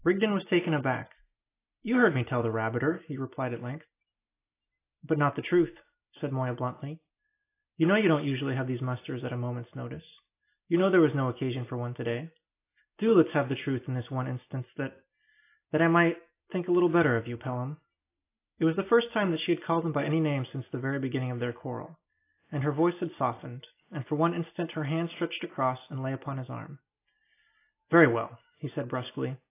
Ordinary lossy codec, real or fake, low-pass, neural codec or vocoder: AAC, 24 kbps; real; 3.6 kHz; none